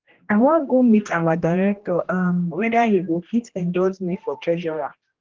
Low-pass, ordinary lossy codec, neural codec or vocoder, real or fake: 7.2 kHz; Opus, 16 kbps; codec, 16 kHz, 1 kbps, X-Codec, HuBERT features, trained on general audio; fake